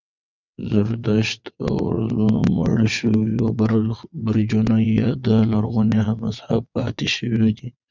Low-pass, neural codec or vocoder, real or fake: 7.2 kHz; vocoder, 22.05 kHz, 80 mel bands, WaveNeXt; fake